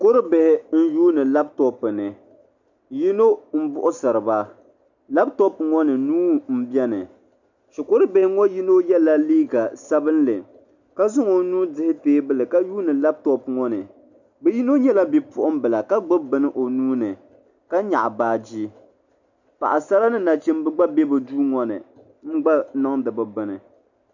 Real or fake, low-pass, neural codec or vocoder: real; 7.2 kHz; none